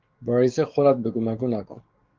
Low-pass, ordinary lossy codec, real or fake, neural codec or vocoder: 7.2 kHz; Opus, 32 kbps; fake; codec, 44.1 kHz, 7.8 kbps, DAC